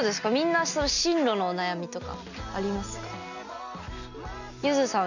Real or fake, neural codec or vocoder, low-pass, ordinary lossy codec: real; none; 7.2 kHz; none